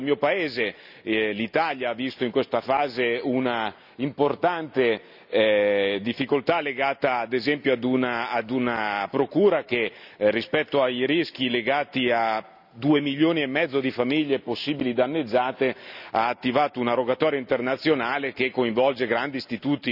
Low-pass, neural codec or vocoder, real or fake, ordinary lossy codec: 5.4 kHz; none; real; none